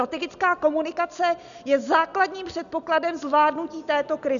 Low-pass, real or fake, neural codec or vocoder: 7.2 kHz; real; none